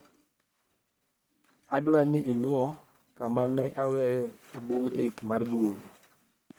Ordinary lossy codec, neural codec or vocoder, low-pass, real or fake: none; codec, 44.1 kHz, 1.7 kbps, Pupu-Codec; none; fake